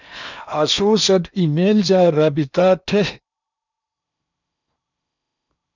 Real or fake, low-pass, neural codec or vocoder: fake; 7.2 kHz; codec, 16 kHz in and 24 kHz out, 0.8 kbps, FocalCodec, streaming, 65536 codes